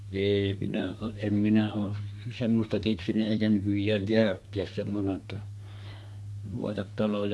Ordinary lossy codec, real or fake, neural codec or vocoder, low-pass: none; fake; codec, 24 kHz, 1 kbps, SNAC; none